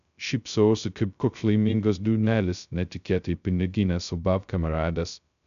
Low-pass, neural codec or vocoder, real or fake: 7.2 kHz; codec, 16 kHz, 0.2 kbps, FocalCodec; fake